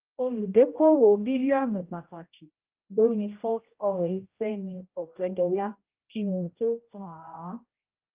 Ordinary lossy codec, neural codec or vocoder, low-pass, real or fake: Opus, 24 kbps; codec, 16 kHz, 0.5 kbps, X-Codec, HuBERT features, trained on general audio; 3.6 kHz; fake